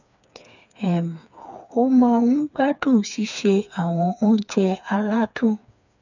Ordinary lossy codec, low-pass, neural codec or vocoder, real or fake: none; 7.2 kHz; codec, 16 kHz, 4 kbps, FreqCodec, smaller model; fake